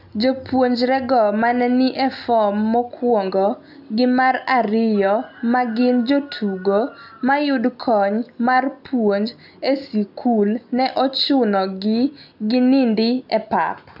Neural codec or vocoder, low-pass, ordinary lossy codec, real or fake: none; 5.4 kHz; none; real